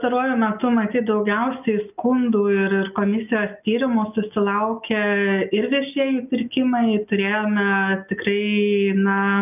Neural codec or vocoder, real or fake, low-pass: none; real; 3.6 kHz